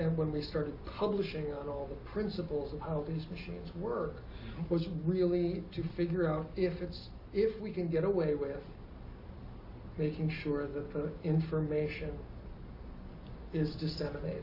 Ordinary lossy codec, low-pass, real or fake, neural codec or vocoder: AAC, 32 kbps; 5.4 kHz; real; none